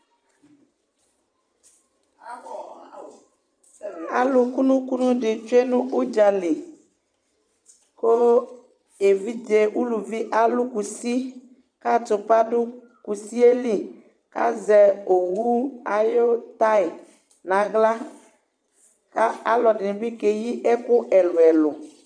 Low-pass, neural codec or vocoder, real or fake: 9.9 kHz; vocoder, 22.05 kHz, 80 mel bands, Vocos; fake